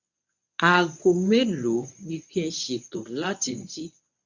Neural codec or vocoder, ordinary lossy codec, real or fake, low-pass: codec, 24 kHz, 0.9 kbps, WavTokenizer, medium speech release version 1; none; fake; 7.2 kHz